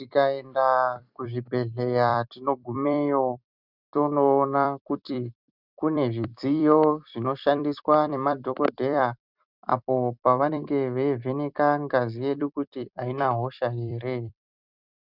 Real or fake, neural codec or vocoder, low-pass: fake; vocoder, 44.1 kHz, 128 mel bands every 256 samples, BigVGAN v2; 5.4 kHz